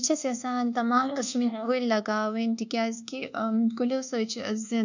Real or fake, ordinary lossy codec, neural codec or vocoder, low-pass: fake; none; codec, 24 kHz, 1.2 kbps, DualCodec; 7.2 kHz